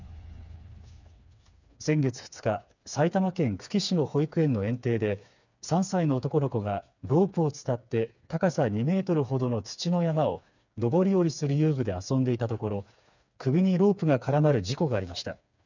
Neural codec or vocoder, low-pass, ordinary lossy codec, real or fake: codec, 16 kHz, 4 kbps, FreqCodec, smaller model; 7.2 kHz; none; fake